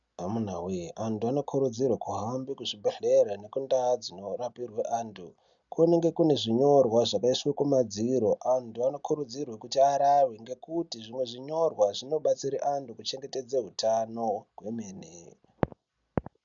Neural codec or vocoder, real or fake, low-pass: none; real; 7.2 kHz